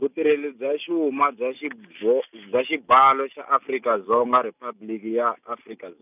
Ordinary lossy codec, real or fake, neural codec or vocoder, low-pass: none; real; none; 3.6 kHz